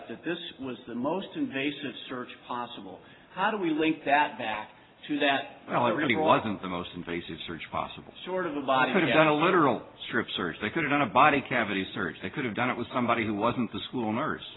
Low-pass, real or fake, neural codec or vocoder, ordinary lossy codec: 7.2 kHz; real; none; AAC, 16 kbps